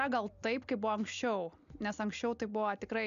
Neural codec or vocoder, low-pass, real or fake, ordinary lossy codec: none; 7.2 kHz; real; MP3, 96 kbps